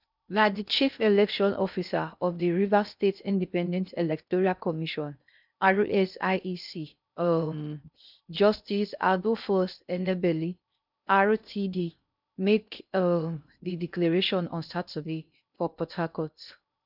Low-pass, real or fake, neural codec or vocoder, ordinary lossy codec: 5.4 kHz; fake; codec, 16 kHz in and 24 kHz out, 0.6 kbps, FocalCodec, streaming, 2048 codes; none